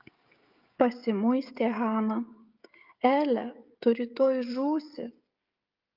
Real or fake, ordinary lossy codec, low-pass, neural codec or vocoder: fake; Opus, 24 kbps; 5.4 kHz; codec, 16 kHz, 16 kbps, FreqCodec, smaller model